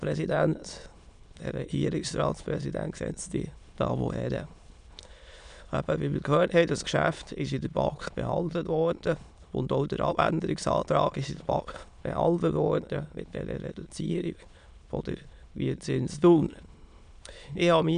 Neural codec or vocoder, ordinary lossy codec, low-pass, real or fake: autoencoder, 22.05 kHz, a latent of 192 numbers a frame, VITS, trained on many speakers; none; 9.9 kHz; fake